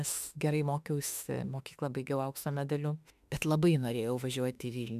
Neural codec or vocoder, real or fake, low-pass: autoencoder, 48 kHz, 32 numbers a frame, DAC-VAE, trained on Japanese speech; fake; 14.4 kHz